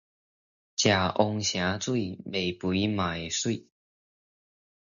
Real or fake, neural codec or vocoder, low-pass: real; none; 7.2 kHz